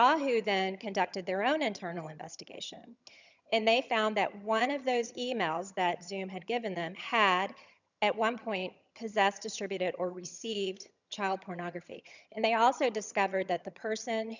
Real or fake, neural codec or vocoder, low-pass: fake; vocoder, 22.05 kHz, 80 mel bands, HiFi-GAN; 7.2 kHz